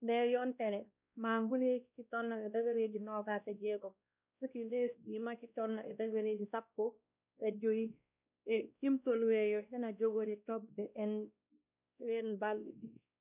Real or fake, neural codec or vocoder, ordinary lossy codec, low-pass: fake; codec, 16 kHz, 1 kbps, X-Codec, WavLM features, trained on Multilingual LibriSpeech; none; 3.6 kHz